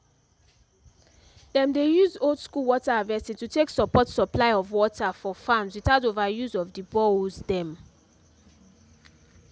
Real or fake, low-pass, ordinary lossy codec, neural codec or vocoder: real; none; none; none